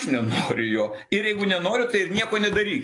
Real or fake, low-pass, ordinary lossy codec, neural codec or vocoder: real; 10.8 kHz; AAC, 48 kbps; none